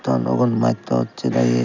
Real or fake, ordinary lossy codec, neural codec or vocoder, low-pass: real; none; none; 7.2 kHz